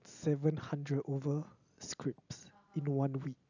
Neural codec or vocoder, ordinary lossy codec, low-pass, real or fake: none; none; 7.2 kHz; real